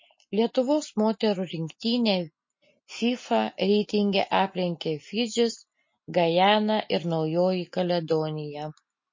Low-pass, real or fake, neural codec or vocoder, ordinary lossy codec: 7.2 kHz; real; none; MP3, 32 kbps